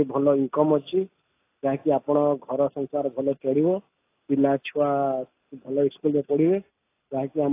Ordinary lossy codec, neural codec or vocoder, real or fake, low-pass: AAC, 24 kbps; none; real; 3.6 kHz